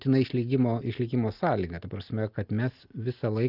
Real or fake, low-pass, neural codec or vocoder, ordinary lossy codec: real; 5.4 kHz; none; Opus, 24 kbps